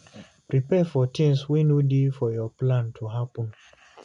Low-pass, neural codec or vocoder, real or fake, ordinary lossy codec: 10.8 kHz; none; real; none